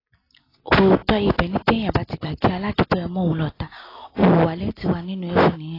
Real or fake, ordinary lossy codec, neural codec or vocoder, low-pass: real; AAC, 24 kbps; none; 5.4 kHz